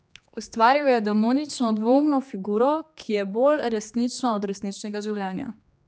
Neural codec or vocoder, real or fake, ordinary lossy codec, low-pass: codec, 16 kHz, 2 kbps, X-Codec, HuBERT features, trained on general audio; fake; none; none